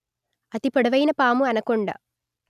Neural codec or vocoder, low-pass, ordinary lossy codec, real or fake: none; 14.4 kHz; none; real